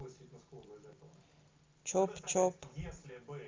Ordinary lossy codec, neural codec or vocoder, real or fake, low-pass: Opus, 32 kbps; none; real; 7.2 kHz